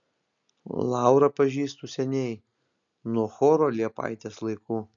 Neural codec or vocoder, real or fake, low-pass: none; real; 7.2 kHz